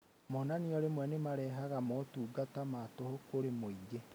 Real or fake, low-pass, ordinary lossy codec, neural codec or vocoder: real; none; none; none